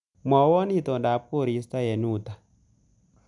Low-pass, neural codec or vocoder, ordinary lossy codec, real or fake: 10.8 kHz; none; MP3, 96 kbps; real